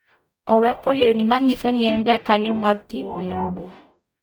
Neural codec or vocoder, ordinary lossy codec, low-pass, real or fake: codec, 44.1 kHz, 0.9 kbps, DAC; none; none; fake